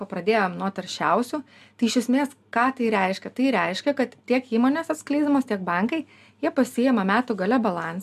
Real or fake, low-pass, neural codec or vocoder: real; 14.4 kHz; none